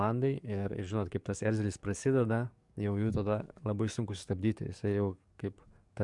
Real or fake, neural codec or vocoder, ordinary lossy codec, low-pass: fake; codec, 44.1 kHz, 7.8 kbps, DAC; MP3, 64 kbps; 10.8 kHz